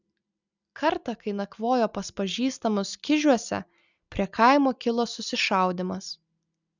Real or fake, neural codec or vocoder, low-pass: real; none; 7.2 kHz